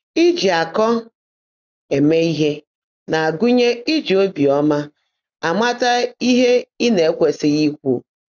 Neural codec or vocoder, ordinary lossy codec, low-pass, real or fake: none; none; 7.2 kHz; real